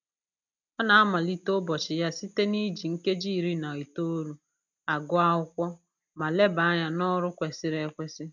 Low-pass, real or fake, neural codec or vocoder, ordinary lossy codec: 7.2 kHz; real; none; none